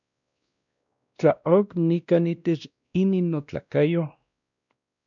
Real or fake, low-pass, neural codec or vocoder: fake; 7.2 kHz; codec, 16 kHz, 1 kbps, X-Codec, WavLM features, trained on Multilingual LibriSpeech